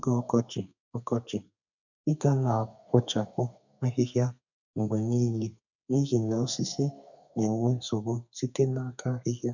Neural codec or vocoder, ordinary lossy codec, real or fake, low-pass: codec, 32 kHz, 1.9 kbps, SNAC; none; fake; 7.2 kHz